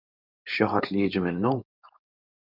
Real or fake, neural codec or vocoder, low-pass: fake; codec, 16 kHz, 4.8 kbps, FACodec; 5.4 kHz